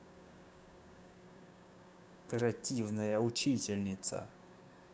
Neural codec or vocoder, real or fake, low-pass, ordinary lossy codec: codec, 16 kHz, 6 kbps, DAC; fake; none; none